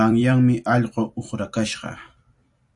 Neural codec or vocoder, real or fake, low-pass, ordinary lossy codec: vocoder, 44.1 kHz, 128 mel bands every 256 samples, BigVGAN v2; fake; 10.8 kHz; AAC, 64 kbps